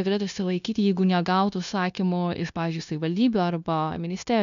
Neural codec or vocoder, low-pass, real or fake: codec, 16 kHz, 0.9 kbps, LongCat-Audio-Codec; 7.2 kHz; fake